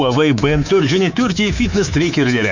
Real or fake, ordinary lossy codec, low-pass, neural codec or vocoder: fake; none; 7.2 kHz; codec, 24 kHz, 3.1 kbps, DualCodec